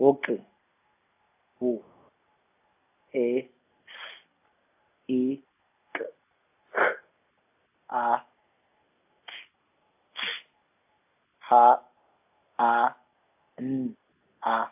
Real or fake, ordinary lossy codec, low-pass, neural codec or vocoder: real; AAC, 32 kbps; 3.6 kHz; none